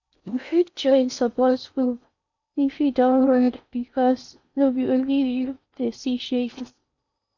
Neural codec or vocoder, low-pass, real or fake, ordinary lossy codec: codec, 16 kHz in and 24 kHz out, 0.6 kbps, FocalCodec, streaming, 4096 codes; 7.2 kHz; fake; none